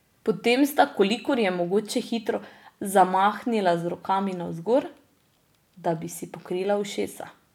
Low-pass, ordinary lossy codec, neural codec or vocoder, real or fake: 19.8 kHz; none; none; real